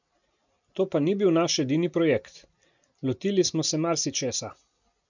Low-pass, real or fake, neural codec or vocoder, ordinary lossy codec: 7.2 kHz; real; none; none